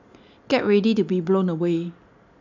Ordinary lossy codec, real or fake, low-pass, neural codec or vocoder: none; real; 7.2 kHz; none